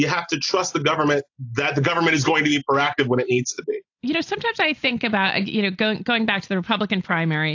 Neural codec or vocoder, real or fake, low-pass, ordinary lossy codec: none; real; 7.2 kHz; AAC, 48 kbps